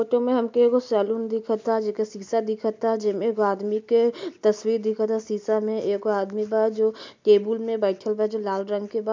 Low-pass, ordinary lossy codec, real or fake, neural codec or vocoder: 7.2 kHz; MP3, 64 kbps; real; none